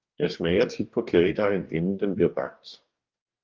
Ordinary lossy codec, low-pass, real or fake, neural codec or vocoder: Opus, 32 kbps; 7.2 kHz; fake; codec, 44.1 kHz, 2.6 kbps, DAC